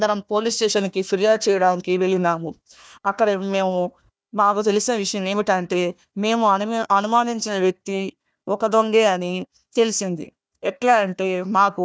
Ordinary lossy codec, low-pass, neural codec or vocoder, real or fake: none; none; codec, 16 kHz, 1 kbps, FunCodec, trained on Chinese and English, 50 frames a second; fake